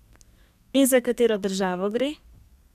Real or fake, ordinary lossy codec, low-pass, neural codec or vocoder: fake; none; 14.4 kHz; codec, 32 kHz, 1.9 kbps, SNAC